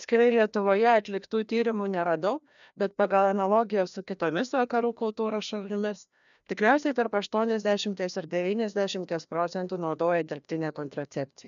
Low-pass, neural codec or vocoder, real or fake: 7.2 kHz; codec, 16 kHz, 1 kbps, FreqCodec, larger model; fake